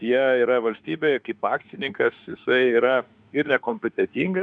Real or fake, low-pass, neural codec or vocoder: fake; 9.9 kHz; autoencoder, 48 kHz, 32 numbers a frame, DAC-VAE, trained on Japanese speech